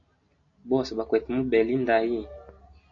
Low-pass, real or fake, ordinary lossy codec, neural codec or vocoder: 7.2 kHz; real; AAC, 48 kbps; none